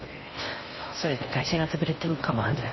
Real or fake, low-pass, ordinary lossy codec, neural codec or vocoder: fake; 7.2 kHz; MP3, 24 kbps; codec, 16 kHz in and 24 kHz out, 0.6 kbps, FocalCodec, streaming, 4096 codes